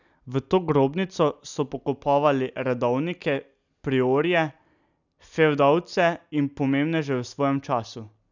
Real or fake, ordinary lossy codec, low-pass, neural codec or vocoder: real; none; 7.2 kHz; none